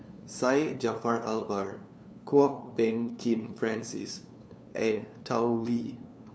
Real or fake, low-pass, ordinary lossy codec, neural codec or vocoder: fake; none; none; codec, 16 kHz, 2 kbps, FunCodec, trained on LibriTTS, 25 frames a second